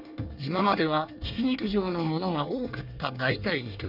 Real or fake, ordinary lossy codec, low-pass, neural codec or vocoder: fake; none; 5.4 kHz; codec, 24 kHz, 1 kbps, SNAC